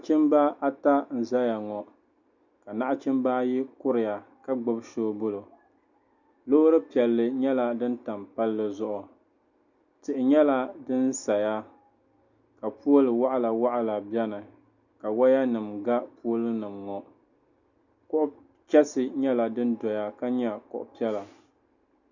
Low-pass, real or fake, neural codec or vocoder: 7.2 kHz; real; none